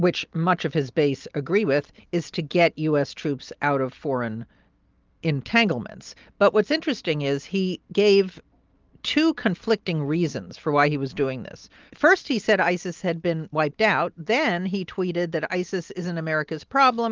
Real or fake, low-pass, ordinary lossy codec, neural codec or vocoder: real; 7.2 kHz; Opus, 32 kbps; none